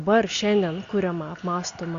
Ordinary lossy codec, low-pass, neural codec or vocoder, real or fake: Opus, 64 kbps; 7.2 kHz; none; real